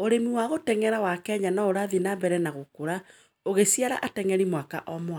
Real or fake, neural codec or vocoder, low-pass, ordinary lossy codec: real; none; none; none